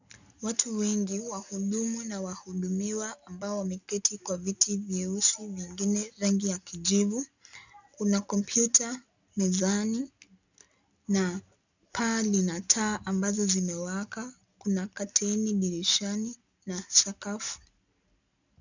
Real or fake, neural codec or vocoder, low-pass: real; none; 7.2 kHz